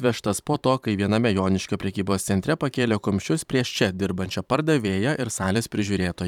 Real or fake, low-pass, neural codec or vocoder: fake; 19.8 kHz; vocoder, 44.1 kHz, 128 mel bands every 512 samples, BigVGAN v2